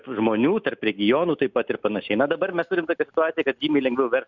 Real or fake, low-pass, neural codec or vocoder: real; 7.2 kHz; none